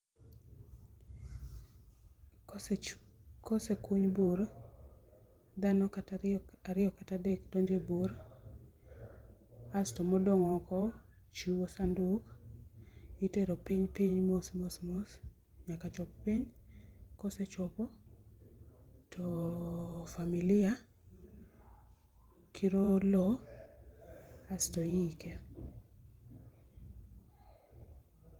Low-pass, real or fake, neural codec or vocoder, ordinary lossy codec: 19.8 kHz; fake; vocoder, 44.1 kHz, 128 mel bands every 512 samples, BigVGAN v2; Opus, 24 kbps